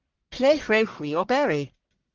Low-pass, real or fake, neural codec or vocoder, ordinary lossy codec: 7.2 kHz; fake; codec, 44.1 kHz, 1.7 kbps, Pupu-Codec; Opus, 16 kbps